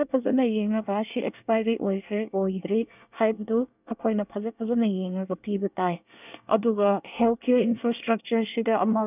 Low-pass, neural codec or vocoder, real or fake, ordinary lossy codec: 3.6 kHz; codec, 24 kHz, 1 kbps, SNAC; fake; none